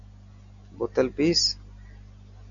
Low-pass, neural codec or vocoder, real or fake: 7.2 kHz; none; real